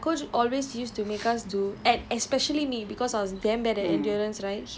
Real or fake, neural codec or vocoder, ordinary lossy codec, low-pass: real; none; none; none